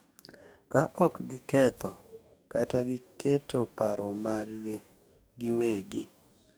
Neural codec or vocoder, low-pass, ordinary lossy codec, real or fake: codec, 44.1 kHz, 2.6 kbps, DAC; none; none; fake